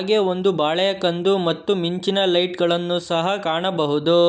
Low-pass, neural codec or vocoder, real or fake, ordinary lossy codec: none; none; real; none